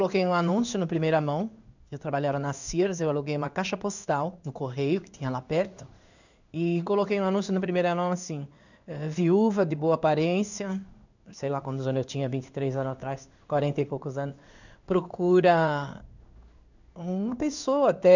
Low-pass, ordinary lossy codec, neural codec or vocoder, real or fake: 7.2 kHz; none; codec, 16 kHz in and 24 kHz out, 1 kbps, XY-Tokenizer; fake